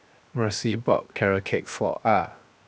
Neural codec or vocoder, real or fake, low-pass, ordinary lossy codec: codec, 16 kHz, 0.7 kbps, FocalCodec; fake; none; none